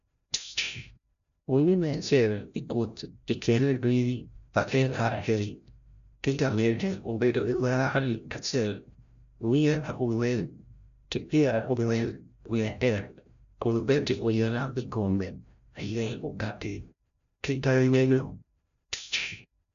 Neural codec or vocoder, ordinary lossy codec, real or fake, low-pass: codec, 16 kHz, 0.5 kbps, FreqCodec, larger model; none; fake; 7.2 kHz